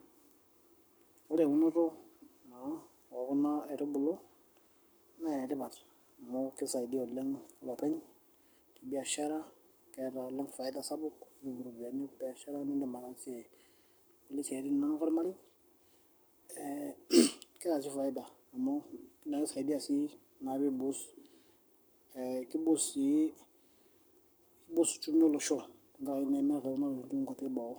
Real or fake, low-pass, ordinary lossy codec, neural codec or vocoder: fake; none; none; codec, 44.1 kHz, 7.8 kbps, Pupu-Codec